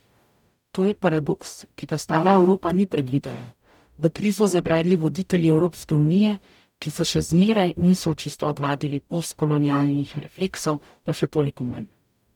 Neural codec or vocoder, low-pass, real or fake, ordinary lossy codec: codec, 44.1 kHz, 0.9 kbps, DAC; 19.8 kHz; fake; none